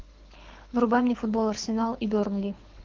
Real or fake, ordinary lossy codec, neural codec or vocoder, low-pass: fake; Opus, 32 kbps; codec, 24 kHz, 6 kbps, HILCodec; 7.2 kHz